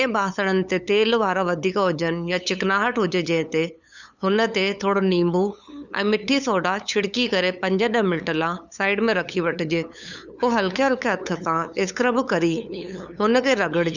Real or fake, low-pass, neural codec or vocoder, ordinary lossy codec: fake; 7.2 kHz; codec, 16 kHz, 8 kbps, FunCodec, trained on LibriTTS, 25 frames a second; none